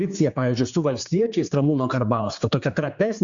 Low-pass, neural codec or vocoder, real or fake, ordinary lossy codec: 7.2 kHz; codec, 16 kHz, 4 kbps, X-Codec, HuBERT features, trained on general audio; fake; Opus, 64 kbps